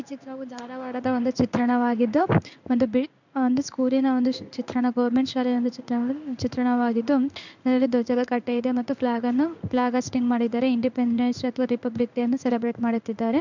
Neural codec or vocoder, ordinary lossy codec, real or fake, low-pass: codec, 16 kHz in and 24 kHz out, 1 kbps, XY-Tokenizer; none; fake; 7.2 kHz